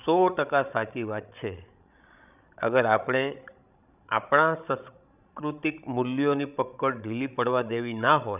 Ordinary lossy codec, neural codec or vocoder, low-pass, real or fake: none; codec, 16 kHz, 16 kbps, FreqCodec, larger model; 3.6 kHz; fake